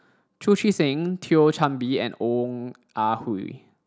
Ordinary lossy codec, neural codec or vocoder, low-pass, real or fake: none; none; none; real